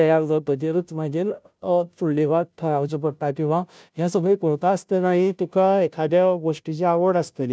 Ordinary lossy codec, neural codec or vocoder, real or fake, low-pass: none; codec, 16 kHz, 0.5 kbps, FunCodec, trained on Chinese and English, 25 frames a second; fake; none